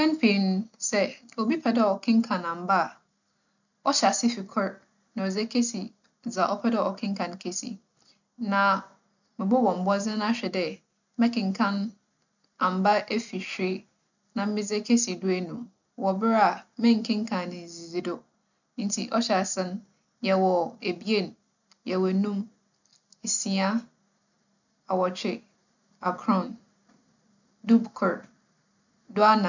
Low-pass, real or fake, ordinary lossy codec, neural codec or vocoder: 7.2 kHz; real; none; none